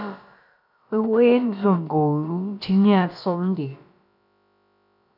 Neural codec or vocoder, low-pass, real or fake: codec, 16 kHz, about 1 kbps, DyCAST, with the encoder's durations; 5.4 kHz; fake